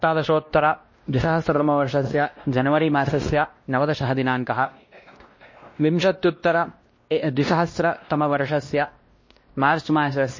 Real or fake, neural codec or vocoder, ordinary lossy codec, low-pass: fake; codec, 16 kHz, 1 kbps, X-Codec, WavLM features, trained on Multilingual LibriSpeech; MP3, 32 kbps; 7.2 kHz